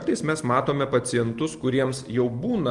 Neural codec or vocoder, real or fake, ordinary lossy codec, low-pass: none; real; Opus, 32 kbps; 10.8 kHz